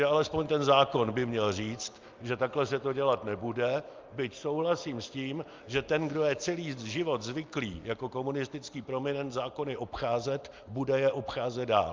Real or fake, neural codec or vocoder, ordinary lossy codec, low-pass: real; none; Opus, 24 kbps; 7.2 kHz